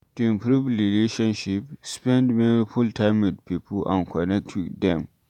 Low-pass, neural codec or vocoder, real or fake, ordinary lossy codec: 19.8 kHz; vocoder, 48 kHz, 128 mel bands, Vocos; fake; none